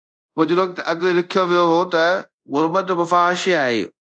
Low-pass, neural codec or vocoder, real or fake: 9.9 kHz; codec, 24 kHz, 0.5 kbps, DualCodec; fake